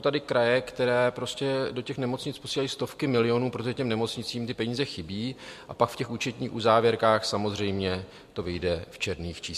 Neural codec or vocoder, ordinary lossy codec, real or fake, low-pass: none; MP3, 64 kbps; real; 14.4 kHz